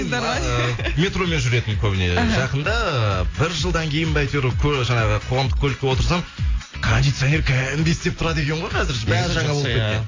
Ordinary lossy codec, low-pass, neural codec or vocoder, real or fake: AAC, 32 kbps; 7.2 kHz; none; real